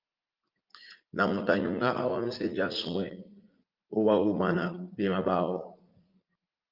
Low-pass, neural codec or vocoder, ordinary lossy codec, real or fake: 5.4 kHz; vocoder, 44.1 kHz, 80 mel bands, Vocos; Opus, 24 kbps; fake